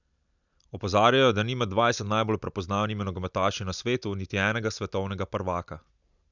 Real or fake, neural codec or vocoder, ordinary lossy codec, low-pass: real; none; none; 7.2 kHz